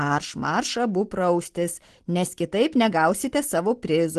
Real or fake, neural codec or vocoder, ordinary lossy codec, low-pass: real; none; Opus, 16 kbps; 10.8 kHz